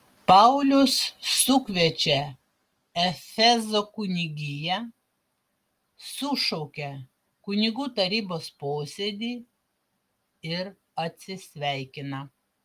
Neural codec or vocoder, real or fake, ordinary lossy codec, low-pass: none; real; Opus, 32 kbps; 14.4 kHz